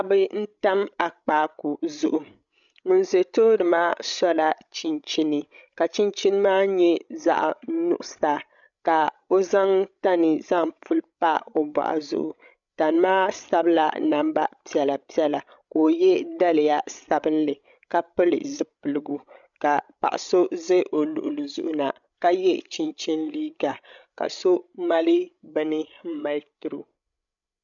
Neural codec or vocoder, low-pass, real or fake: codec, 16 kHz, 16 kbps, FreqCodec, larger model; 7.2 kHz; fake